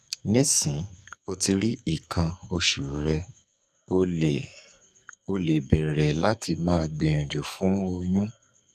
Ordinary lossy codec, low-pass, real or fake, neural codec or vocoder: none; 14.4 kHz; fake; codec, 44.1 kHz, 2.6 kbps, SNAC